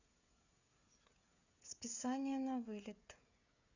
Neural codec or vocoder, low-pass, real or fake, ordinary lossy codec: codec, 16 kHz, 16 kbps, FreqCodec, smaller model; 7.2 kHz; fake; none